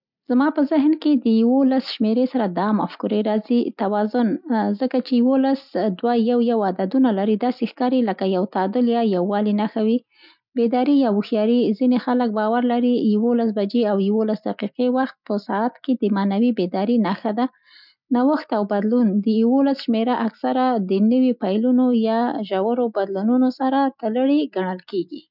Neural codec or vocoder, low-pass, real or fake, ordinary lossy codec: none; 5.4 kHz; real; none